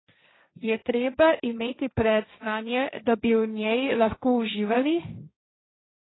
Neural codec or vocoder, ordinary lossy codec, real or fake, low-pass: codec, 16 kHz, 1.1 kbps, Voila-Tokenizer; AAC, 16 kbps; fake; 7.2 kHz